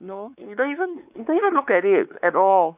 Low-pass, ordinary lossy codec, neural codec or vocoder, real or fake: 3.6 kHz; none; codec, 16 kHz, 4 kbps, X-Codec, WavLM features, trained on Multilingual LibriSpeech; fake